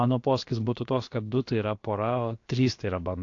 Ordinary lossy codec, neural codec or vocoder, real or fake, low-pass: AAC, 48 kbps; codec, 16 kHz, about 1 kbps, DyCAST, with the encoder's durations; fake; 7.2 kHz